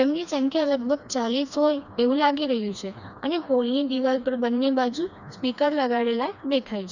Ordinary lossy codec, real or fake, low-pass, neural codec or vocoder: none; fake; 7.2 kHz; codec, 16 kHz, 2 kbps, FreqCodec, smaller model